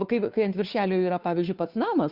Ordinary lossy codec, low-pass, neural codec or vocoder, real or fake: Opus, 64 kbps; 5.4 kHz; codec, 16 kHz, 2 kbps, FunCodec, trained on Chinese and English, 25 frames a second; fake